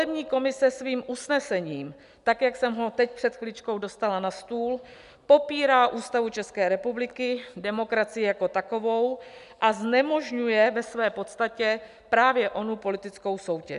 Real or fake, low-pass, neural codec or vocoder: real; 10.8 kHz; none